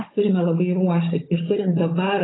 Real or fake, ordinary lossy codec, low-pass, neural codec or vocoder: fake; AAC, 16 kbps; 7.2 kHz; codec, 16 kHz, 16 kbps, FunCodec, trained on Chinese and English, 50 frames a second